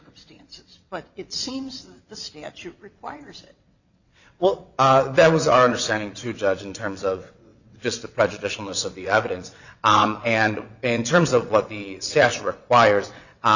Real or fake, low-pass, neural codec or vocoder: fake; 7.2 kHz; vocoder, 22.05 kHz, 80 mel bands, WaveNeXt